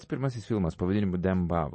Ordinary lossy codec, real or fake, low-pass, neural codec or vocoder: MP3, 32 kbps; real; 10.8 kHz; none